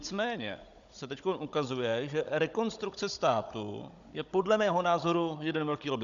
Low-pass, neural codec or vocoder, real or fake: 7.2 kHz; codec, 16 kHz, 16 kbps, FunCodec, trained on Chinese and English, 50 frames a second; fake